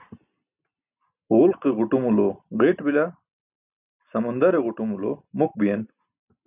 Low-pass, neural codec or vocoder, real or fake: 3.6 kHz; none; real